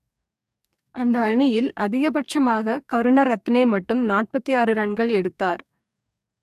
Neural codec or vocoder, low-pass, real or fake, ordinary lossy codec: codec, 44.1 kHz, 2.6 kbps, DAC; 14.4 kHz; fake; AAC, 96 kbps